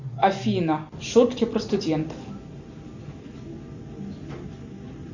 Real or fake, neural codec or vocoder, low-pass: real; none; 7.2 kHz